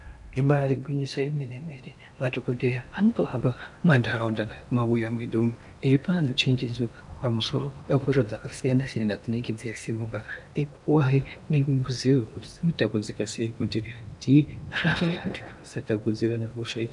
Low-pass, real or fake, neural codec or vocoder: 10.8 kHz; fake; codec, 16 kHz in and 24 kHz out, 0.8 kbps, FocalCodec, streaming, 65536 codes